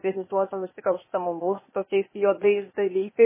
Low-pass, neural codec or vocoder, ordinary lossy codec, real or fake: 3.6 kHz; codec, 16 kHz, 0.8 kbps, ZipCodec; MP3, 16 kbps; fake